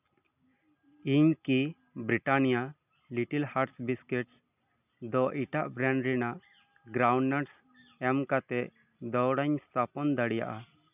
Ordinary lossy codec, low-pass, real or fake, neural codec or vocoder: none; 3.6 kHz; real; none